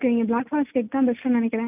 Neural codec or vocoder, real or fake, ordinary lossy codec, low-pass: none; real; none; 3.6 kHz